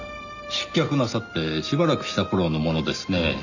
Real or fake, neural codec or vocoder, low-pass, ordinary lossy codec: real; none; 7.2 kHz; none